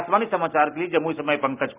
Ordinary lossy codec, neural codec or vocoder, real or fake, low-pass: Opus, 24 kbps; none; real; 3.6 kHz